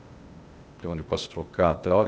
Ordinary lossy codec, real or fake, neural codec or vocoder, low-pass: none; fake; codec, 16 kHz, 0.8 kbps, ZipCodec; none